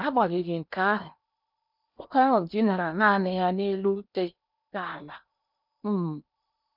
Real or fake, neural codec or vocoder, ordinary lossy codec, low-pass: fake; codec, 16 kHz in and 24 kHz out, 0.8 kbps, FocalCodec, streaming, 65536 codes; none; 5.4 kHz